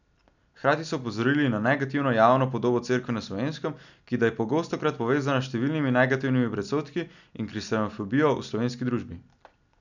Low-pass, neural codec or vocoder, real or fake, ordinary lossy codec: 7.2 kHz; none; real; none